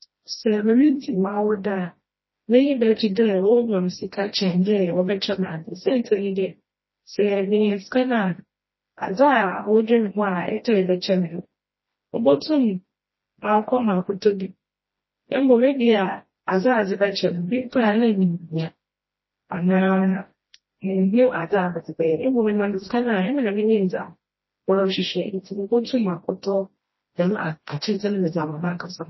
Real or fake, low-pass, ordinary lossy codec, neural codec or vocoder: fake; 7.2 kHz; MP3, 24 kbps; codec, 16 kHz, 1 kbps, FreqCodec, smaller model